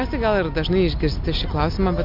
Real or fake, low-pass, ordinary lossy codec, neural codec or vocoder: real; 5.4 kHz; MP3, 48 kbps; none